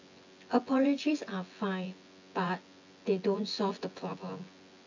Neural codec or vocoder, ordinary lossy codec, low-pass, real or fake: vocoder, 24 kHz, 100 mel bands, Vocos; AAC, 48 kbps; 7.2 kHz; fake